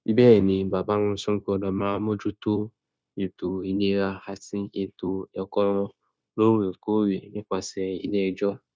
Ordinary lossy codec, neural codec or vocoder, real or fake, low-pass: none; codec, 16 kHz, 0.9 kbps, LongCat-Audio-Codec; fake; none